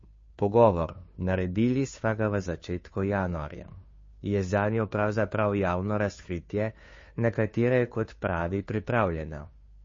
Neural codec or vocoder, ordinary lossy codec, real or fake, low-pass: codec, 16 kHz, 2 kbps, FunCodec, trained on Chinese and English, 25 frames a second; MP3, 32 kbps; fake; 7.2 kHz